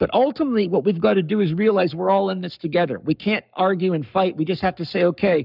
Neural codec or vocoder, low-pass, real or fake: codec, 44.1 kHz, 7.8 kbps, Pupu-Codec; 5.4 kHz; fake